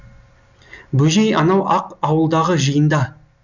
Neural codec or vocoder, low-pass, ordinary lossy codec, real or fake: none; 7.2 kHz; none; real